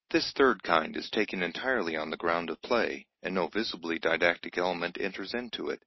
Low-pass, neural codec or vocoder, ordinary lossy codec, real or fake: 7.2 kHz; none; MP3, 24 kbps; real